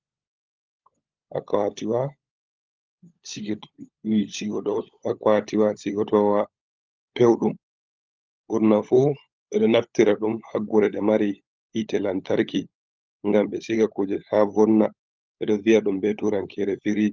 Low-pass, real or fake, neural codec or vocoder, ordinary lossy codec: 7.2 kHz; fake; codec, 16 kHz, 16 kbps, FunCodec, trained on LibriTTS, 50 frames a second; Opus, 24 kbps